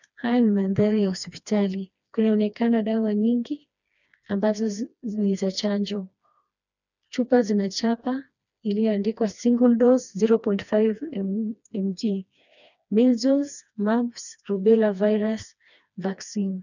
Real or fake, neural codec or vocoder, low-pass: fake; codec, 16 kHz, 2 kbps, FreqCodec, smaller model; 7.2 kHz